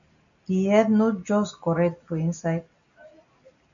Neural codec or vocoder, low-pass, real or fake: none; 7.2 kHz; real